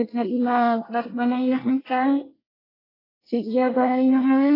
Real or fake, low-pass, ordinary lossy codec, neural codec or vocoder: fake; 5.4 kHz; AAC, 24 kbps; codec, 24 kHz, 1 kbps, SNAC